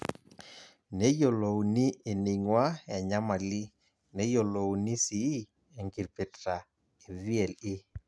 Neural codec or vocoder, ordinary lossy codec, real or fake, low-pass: none; none; real; none